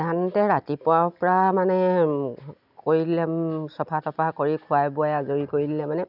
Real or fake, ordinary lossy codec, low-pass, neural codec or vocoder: real; none; 5.4 kHz; none